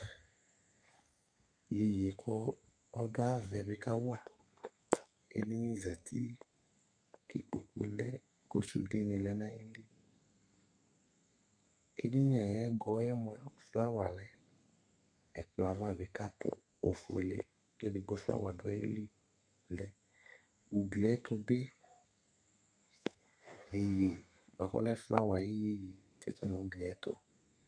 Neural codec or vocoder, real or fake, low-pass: codec, 32 kHz, 1.9 kbps, SNAC; fake; 9.9 kHz